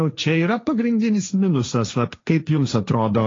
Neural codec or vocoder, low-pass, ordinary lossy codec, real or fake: codec, 16 kHz, 1.1 kbps, Voila-Tokenizer; 7.2 kHz; AAC, 32 kbps; fake